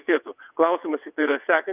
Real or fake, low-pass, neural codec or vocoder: fake; 3.6 kHz; vocoder, 22.05 kHz, 80 mel bands, WaveNeXt